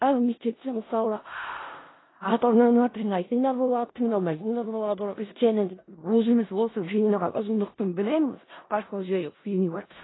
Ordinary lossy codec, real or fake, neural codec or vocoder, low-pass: AAC, 16 kbps; fake; codec, 16 kHz in and 24 kHz out, 0.4 kbps, LongCat-Audio-Codec, four codebook decoder; 7.2 kHz